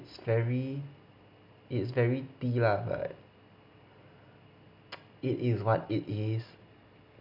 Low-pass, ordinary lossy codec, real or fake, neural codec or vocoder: 5.4 kHz; none; real; none